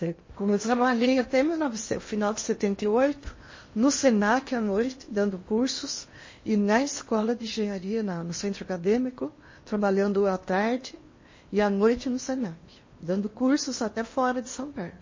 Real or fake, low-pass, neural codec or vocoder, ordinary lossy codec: fake; 7.2 kHz; codec, 16 kHz in and 24 kHz out, 0.8 kbps, FocalCodec, streaming, 65536 codes; MP3, 32 kbps